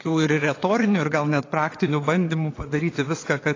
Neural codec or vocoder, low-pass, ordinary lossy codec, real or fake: vocoder, 22.05 kHz, 80 mel bands, WaveNeXt; 7.2 kHz; AAC, 32 kbps; fake